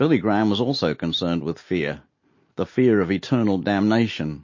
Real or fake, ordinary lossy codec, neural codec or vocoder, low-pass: real; MP3, 32 kbps; none; 7.2 kHz